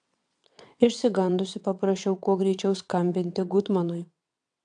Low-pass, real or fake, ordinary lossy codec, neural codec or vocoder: 9.9 kHz; fake; AAC, 64 kbps; vocoder, 22.05 kHz, 80 mel bands, WaveNeXt